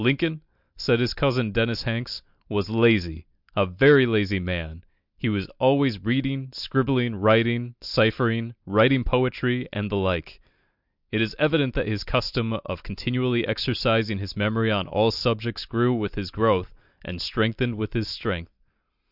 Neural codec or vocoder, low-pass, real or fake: none; 5.4 kHz; real